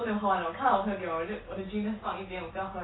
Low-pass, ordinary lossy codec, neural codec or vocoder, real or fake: 7.2 kHz; AAC, 16 kbps; none; real